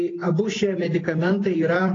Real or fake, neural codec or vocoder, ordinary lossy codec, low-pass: real; none; AAC, 32 kbps; 7.2 kHz